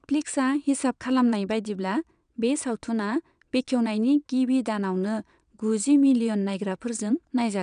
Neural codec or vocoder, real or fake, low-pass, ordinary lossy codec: vocoder, 22.05 kHz, 80 mel bands, WaveNeXt; fake; 9.9 kHz; none